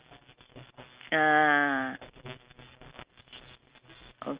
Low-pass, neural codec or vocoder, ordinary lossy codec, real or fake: 3.6 kHz; none; Opus, 24 kbps; real